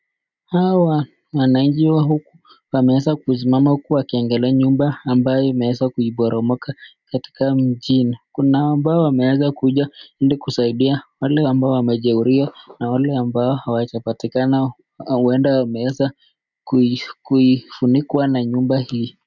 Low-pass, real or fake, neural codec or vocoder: 7.2 kHz; real; none